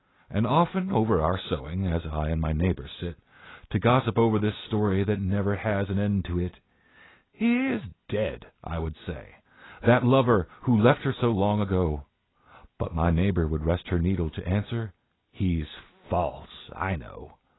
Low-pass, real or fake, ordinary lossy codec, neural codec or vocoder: 7.2 kHz; real; AAC, 16 kbps; none